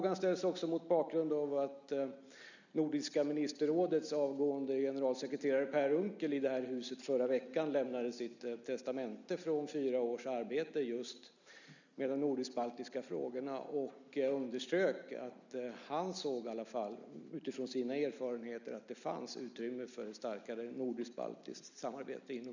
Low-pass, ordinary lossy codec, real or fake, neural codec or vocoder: 7.2 kHz; none; real; none